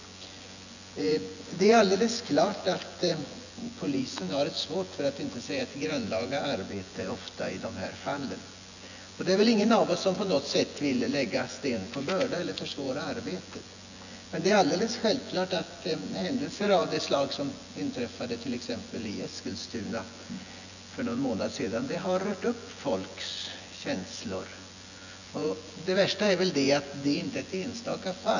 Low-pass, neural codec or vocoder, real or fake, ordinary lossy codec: 7.2 kHz; vocoder, 24 kHz, 100 mel bands, Vocos; fake; none